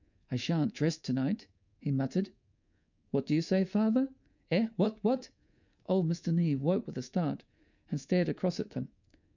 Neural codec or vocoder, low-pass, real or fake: codec, 24 kHz, 3.1 kbps, DualCodec; 7.2 kHz; fake